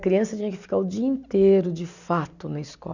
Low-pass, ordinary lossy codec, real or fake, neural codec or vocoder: 7.2 kHz; none; real; none